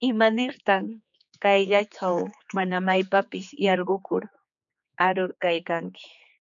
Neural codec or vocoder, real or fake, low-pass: codec, 16 kHz, 4 kbps, X-Codec, HuBERT features, trained on general audio; fake; 7.2 kHz